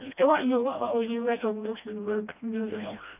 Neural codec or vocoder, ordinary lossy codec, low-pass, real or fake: codec, 16 kHz, 1 kbps, FreqCodec, smaller model; none; 3.6 kHz; fake